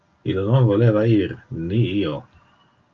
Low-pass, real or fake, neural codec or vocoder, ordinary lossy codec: 7.2 kHz; real; none; Opus, 24 kbps